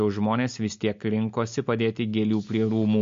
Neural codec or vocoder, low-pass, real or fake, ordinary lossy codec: none; 7.2 kHz; real; MP3, 48 kbps